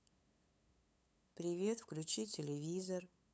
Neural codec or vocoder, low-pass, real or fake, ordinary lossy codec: codec, 16 kHz, 8 kbps, FunCodec, trained on LibriTTS, 25 frames a second; none; fake; none